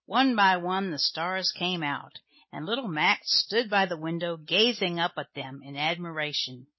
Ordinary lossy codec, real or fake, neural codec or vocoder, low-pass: MP3, 24 kbps; real; none; 7.2 kHz